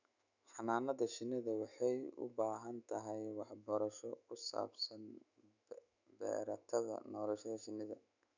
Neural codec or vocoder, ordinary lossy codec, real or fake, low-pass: autoencoder, 48 kHz, 128 numbers a frame, DAC-VAE, trained on Japanese speech; none; fake; 7.2 kHz